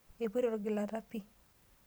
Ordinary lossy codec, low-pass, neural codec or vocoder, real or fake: none; none; vocoder, 44.1 kHz, 128 mel bands every 512 samples, BigVGAN v2; fake